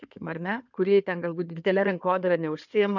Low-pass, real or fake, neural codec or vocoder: 7.2 kHz; fake; codec, 16 kHz in and 24 kHz out, 2.2 kbps, FireRedTTS-2 codec